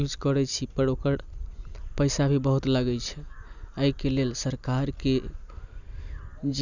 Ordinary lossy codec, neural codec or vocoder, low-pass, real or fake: none; none; 7.2 kHz; real